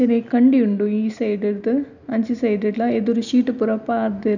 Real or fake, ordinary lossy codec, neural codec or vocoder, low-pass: real; none; none; 7.2 kHz